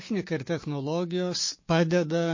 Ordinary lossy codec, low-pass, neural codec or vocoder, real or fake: MP3, 32 kbps; 7.2 kHz; codec, 16 kHz, 6 kbps, DAC; fake